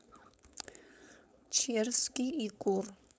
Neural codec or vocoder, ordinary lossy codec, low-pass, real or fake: codec, 16 kHz, 4.8 kbps, FACodec; none; none; fake